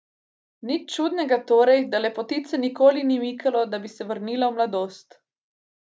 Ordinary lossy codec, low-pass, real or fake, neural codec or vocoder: none; none; real; none